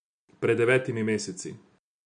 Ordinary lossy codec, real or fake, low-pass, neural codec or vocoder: none; real; 9.9 kHz; none